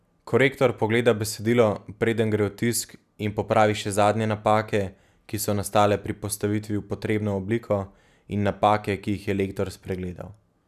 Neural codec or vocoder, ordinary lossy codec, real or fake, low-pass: none; none; real; 14.4 kHz